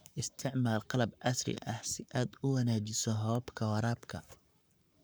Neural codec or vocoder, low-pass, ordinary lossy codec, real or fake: codec, 44.1 kHz, 7.8 kbps, Pupu-Codec; none; none; fake